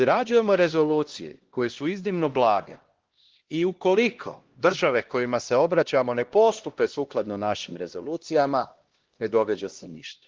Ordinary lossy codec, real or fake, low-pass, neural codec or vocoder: Opus, 16 kbps; fake; 7.2 kHz; codec, 16 kHz, 1 kbps, X-Codec, HuBERT features, trained on LibriSpeech